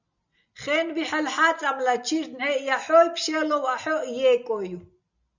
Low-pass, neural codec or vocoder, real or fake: 7.2 kHz; none; real